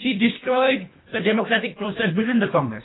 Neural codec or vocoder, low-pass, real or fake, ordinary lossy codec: codec, 24 kHz, 1.5 kbps, HILCodec; 7.2 kHz; fake; AAC, 16 kbps